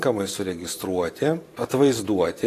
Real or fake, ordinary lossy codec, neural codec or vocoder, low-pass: fake; AAC, 48 kbps; vocoder, 44.1 kHz, 128 mel bands every 512 samples, BigVGAN v2; 14.4 kHz